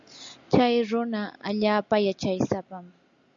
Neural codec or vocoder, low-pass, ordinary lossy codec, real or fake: none; 7.2 kHz; MP3, 64 kbps; real